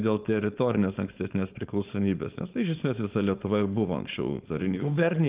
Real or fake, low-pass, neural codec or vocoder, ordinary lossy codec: fake; 3.6 kHz; codec, 16 kHz, 4.8 kbps, FACodec; Opus, 32 kbps